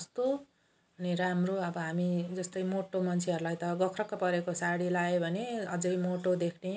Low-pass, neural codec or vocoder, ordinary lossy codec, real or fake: none; none; none; real